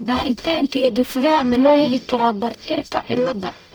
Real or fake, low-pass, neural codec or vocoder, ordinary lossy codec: fake; none; codec, 44.1 kHz, 0.9 kbps, DAC; none